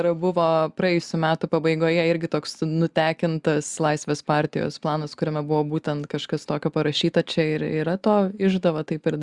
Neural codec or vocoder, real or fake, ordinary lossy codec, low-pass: none; real; Opus, 64 kbps; 10.8 kHz